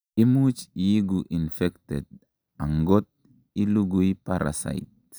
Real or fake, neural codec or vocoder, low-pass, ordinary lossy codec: real; none; none; none